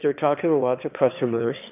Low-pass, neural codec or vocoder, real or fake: 3.6 kHz; autoencoder, 22.05 kHz, a latent of 192 numbers a frame, VITS, trained on one speaker; fake